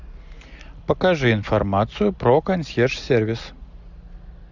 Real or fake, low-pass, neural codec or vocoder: real; 7.2 kHz; none